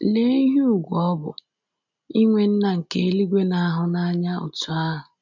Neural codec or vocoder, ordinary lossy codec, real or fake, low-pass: none; none; real; 7.2 kHz